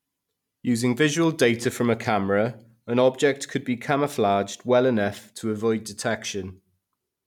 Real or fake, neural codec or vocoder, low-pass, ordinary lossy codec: real; none; 19.8 kHz; none